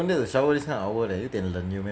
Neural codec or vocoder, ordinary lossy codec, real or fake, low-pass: none; none; real; none